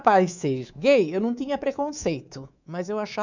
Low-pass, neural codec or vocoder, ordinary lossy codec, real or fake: 7.2 kHz; codec, 24 kHz, 3.1 kbps, DualCodec; none; fake